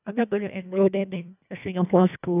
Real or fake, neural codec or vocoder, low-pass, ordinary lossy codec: fake; codec, 24 kHz, 1.5 kbps, HILCodec; 3.6 kHz; none